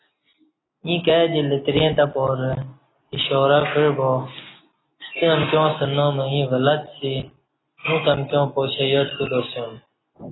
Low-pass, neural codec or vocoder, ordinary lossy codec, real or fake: 7.2 kHz; none; AAC, 16 kbps; real